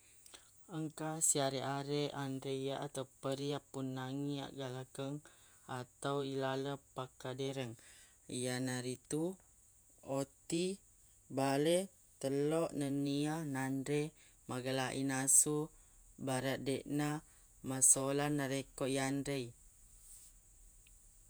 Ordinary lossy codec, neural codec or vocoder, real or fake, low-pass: none; vocoder, 48 kHz, 128 mel bands, Vocos; fake; none